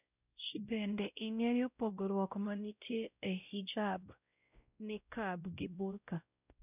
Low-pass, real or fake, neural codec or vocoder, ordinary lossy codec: 3.6 kHz; fake; codec, 16 kHz, 0.5 kbps, X-Codec, WavLM features, trained on Multilingual LibriSpeech; none